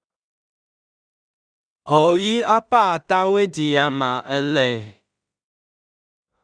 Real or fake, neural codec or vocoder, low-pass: fake; codec, 16 kHz in and 24 kHz out, 0.4 kbps, LongCat-Audio-Codec, two codebook decoder; 9.9 kHz